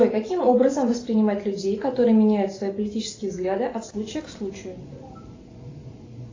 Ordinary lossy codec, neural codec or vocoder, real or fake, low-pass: AAC, 32 kbps; none; real; 7.2 kHz